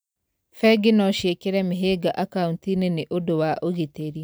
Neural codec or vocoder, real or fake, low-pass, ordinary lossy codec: none; real; none; none